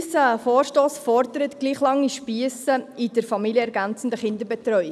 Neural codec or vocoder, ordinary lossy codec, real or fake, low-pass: none; none; real; none